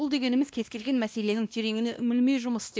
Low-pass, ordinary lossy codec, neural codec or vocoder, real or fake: none; none; codec, 16 kHz, 1 kbps, X-Codec, WavLM features, trained on Multilingual LibriSpeech; fake